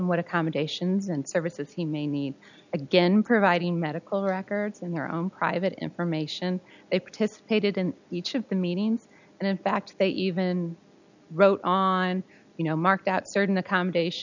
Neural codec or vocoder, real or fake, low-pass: none; real; 7.2 kHz